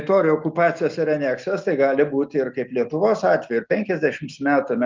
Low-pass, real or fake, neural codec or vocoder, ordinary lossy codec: 7.2 kHz; real; none; Opus, 32 kbps